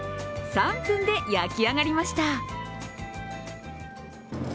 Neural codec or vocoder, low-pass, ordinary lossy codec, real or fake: none; none; none; real